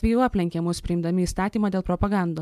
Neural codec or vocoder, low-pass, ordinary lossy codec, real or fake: none; 14.4 kHz; MP3, 96 kbps; real